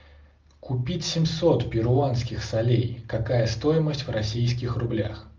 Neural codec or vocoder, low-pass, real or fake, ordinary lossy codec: none; 7.2 kHz; real; Opus, 32 kbps